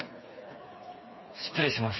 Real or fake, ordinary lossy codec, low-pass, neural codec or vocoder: fake; MP3, 24 kbps; 7.2 kHz; codec, 16 kHz, 4 kbps, FreqCodec, smaller model